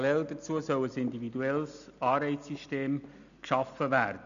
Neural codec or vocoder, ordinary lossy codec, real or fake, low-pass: none; none; real; 7.2 kHz